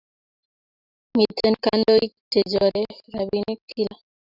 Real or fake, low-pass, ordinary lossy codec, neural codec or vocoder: fake; 5.4 kHz; Opus, 64 kbps; vocoder, 44.1 kHz, 128 mel bands every 512 samples, BigVGAN v2